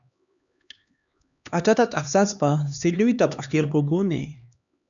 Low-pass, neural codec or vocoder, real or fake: 7.2 kHz; codec, 16 kHz, 2 kbps, X-Codec, HuBERT features, trained on LibriSpeech; fake